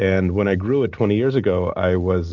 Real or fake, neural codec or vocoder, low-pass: real; none; 7.2 kHz